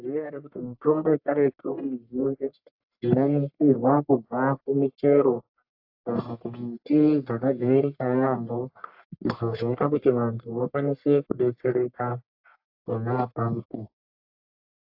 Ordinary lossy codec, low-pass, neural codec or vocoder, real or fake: AAC, 48 kbps; 5.4 kHz; codec, 44.1 kHz, 1.7 kbps, Pupu-Codec; fake